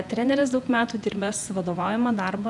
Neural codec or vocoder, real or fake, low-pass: vocoder, 48 kHz, 128 mel bands, Vocos; fake; 10.8 kHz